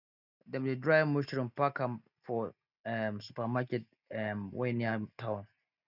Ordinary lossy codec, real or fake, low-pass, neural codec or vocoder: none; real; 5.4 kHz; none